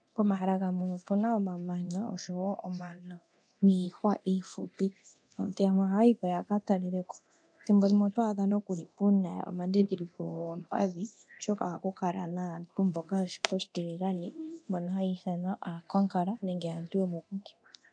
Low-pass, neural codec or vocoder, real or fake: 9.9 kHz; codec, 24 kHz, 0.9 kbps, DualCodec; fake